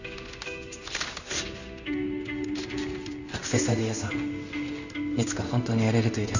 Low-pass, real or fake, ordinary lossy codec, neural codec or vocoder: 7.2 kHz; fake; none; codec, 16 kHz in and 24 kHz out, 1 kbps, XY-Tokenizer